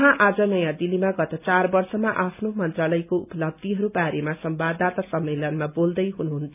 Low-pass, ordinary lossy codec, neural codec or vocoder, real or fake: 3.6 kHz; none; none; real